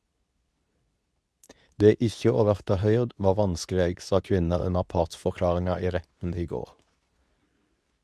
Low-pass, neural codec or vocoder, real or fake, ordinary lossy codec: none; codec, 24 kHz, 0.9 kbps, WavTokenizer, medium speech release version 2; fake; none